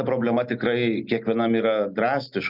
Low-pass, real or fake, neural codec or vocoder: 5.4 kHz; real; none